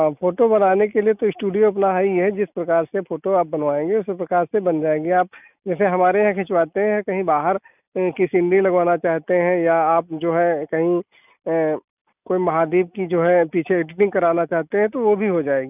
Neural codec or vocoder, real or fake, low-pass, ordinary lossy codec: none; real; 3.6 kHz; none